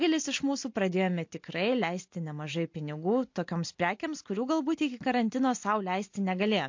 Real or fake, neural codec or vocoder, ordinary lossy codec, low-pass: real; none; MP3, 48 kbps; 7.2 kHz